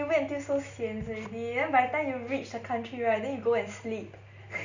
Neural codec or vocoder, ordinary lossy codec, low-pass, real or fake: none; Opus, 64 kbps; 7.2 kHz; real